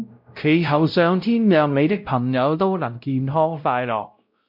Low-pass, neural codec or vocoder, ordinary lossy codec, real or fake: 5.4 kHz; codec, 16 kHz, 0.5 kbps, X-Codec, WavLM features, trained on Multilingual LibriSpeech; MP3, 48 kbps; fake